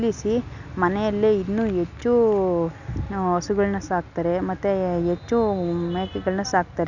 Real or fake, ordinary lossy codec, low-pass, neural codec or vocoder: real; none; 7.2 kHz; none